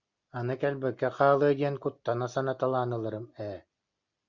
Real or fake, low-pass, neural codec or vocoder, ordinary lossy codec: real; 7.2 kHz; none; Opus, 64 kbps